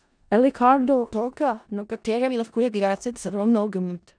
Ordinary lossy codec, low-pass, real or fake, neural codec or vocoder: none; 9.9 kHz; fake; codec, 16 kHz in and 24 kHz out, 0.4 kbps, LongCat-Audio-Codec, four codebook decoder